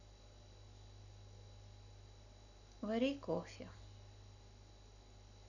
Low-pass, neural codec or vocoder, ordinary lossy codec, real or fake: 7.2 kHz; none; none; real